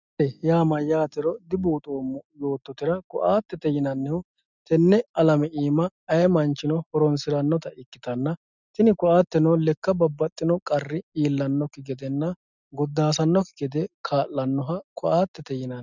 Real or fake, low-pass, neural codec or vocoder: real; 7.2 kHz; none